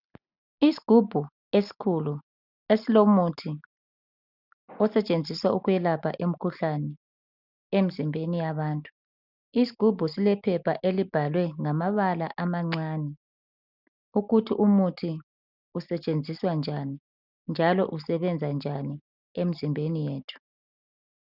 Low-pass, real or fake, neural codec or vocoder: 5.4 kHz; real; none